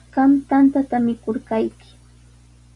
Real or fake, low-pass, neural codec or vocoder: real; 10.8 kHz; none